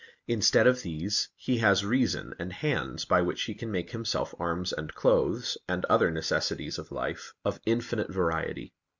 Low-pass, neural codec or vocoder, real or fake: 7.2 kHz; none; real